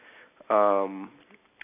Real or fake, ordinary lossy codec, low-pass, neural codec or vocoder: real; none; 3.6 kHz; none